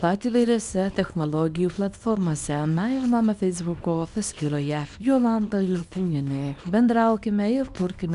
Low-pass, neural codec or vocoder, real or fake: 10.8 kHz; codec, 24 kHz, 0.9 kbps, WavTokenizer, small release; fake